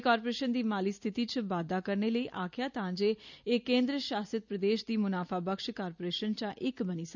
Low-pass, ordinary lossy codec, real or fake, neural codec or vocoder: 7.2 kHz; none; real; none